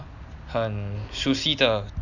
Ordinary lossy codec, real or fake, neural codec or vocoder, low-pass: none; real; none; 7.2 kHz